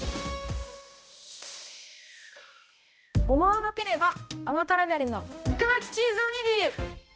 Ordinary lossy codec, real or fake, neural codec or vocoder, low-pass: none; fake; codec, 16 kHz, 0.5 kbps, X-Codec, HuBERT features, trained on balanced general audio; none